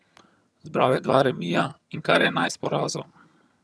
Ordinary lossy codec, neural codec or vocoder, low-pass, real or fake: none; vocoder, 22.05 kHz, 80 mel bands, HiFi-GAN; none; fake